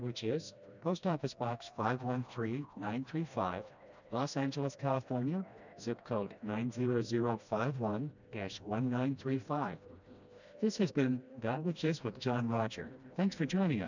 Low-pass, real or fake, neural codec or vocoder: 7.2 kHz; fake; codec, 16 kHz, 1 kbps, FreqCodec, smaller model